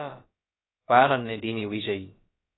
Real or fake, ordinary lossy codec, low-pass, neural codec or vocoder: fake; AAC, 16 kbps; 7.2 kHz; codec, 16 kHz, about 1 kbps, DyCAST, with the encoder's durations